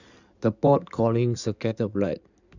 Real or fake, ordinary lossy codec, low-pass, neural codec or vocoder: fake; none; 7.2 kHz; codec, 16 kHz in and 24 kHz out, 2.2 kbps, FireRedTTS-2 codec